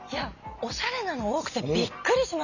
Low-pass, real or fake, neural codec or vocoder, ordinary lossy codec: 7.2 kHz; real; none; none